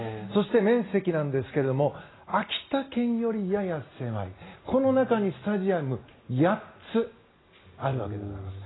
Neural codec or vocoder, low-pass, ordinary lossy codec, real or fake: none; 7.2 kHz; AAC, 16 kbps; real